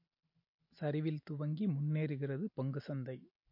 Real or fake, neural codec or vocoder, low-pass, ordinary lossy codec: real; none; 5.4 kHz; MP3, 32 kbps